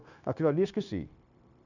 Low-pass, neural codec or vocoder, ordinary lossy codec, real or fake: 7.2 kHz; codec, 16 kHz, 0.9 kbps, LongCat-Audio-Codec; none; fake